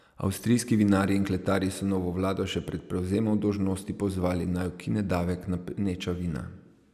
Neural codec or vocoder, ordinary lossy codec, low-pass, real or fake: none; none; 14.4 kHz; real